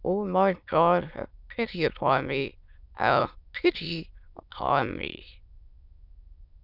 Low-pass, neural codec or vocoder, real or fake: 5.4 kHz; autoencoder, 22.05 kHz, a latent of 192 numbers a frame, VITS, trained on many speakers; fake